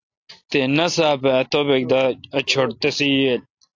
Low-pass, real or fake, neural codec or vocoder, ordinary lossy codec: 7.2 kHz; real; none; AAC, 48 kbps